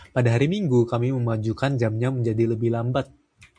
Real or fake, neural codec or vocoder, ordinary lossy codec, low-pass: real; none; MP3, 64 kbps; 9.9 kHz